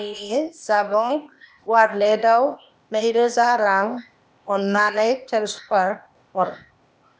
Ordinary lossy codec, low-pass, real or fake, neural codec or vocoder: none; none; fake; codec, 16 kHz, 0.8 kbps, ZipCodec